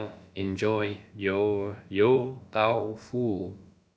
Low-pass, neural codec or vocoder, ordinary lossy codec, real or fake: none; codec, 16 kHz, about 1 kbps, DyCAST, with the encoder's durations; none; fake